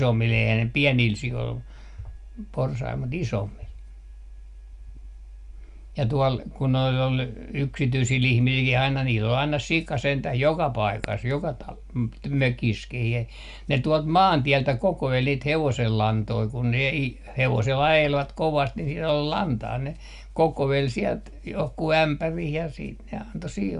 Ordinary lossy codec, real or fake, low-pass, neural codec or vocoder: Opus, 64 kbps; real; 10.8 kHz; none